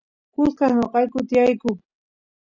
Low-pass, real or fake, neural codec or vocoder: 7.2 kHz; real; none